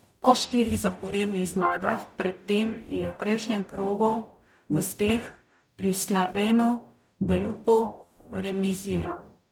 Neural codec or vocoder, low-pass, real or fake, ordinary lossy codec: codec, 44.1 kHz, 0.9 kbps, DAC; 19.8 kHz; fake; none